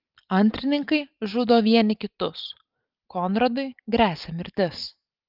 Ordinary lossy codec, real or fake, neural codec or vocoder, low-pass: Opus, 24 kbps; real; none; 5.4 kHz